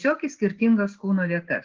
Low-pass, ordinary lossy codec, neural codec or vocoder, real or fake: 7.2 kHz; Opus, 16 kbps; none; real